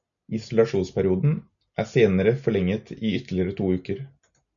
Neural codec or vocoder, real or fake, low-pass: none; real; 7.2 kHz